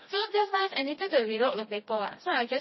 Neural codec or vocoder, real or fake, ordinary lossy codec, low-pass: codec, 16 kHz, 1 kbps, FreqCodec, smaller model; fake; MP3, 24 kbps; 7.2 kHz